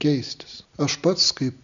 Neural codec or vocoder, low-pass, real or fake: none; 7.2 kHz; real